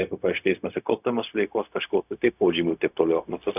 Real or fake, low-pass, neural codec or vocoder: fake; 3.6 kHz; codec, 16 kHz, 0.4 kbps, LongCat-Audio-Codec